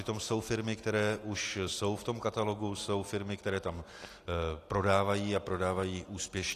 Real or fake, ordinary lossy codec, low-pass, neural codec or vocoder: real; AAC, 48 kbps; 14.4 kHz; none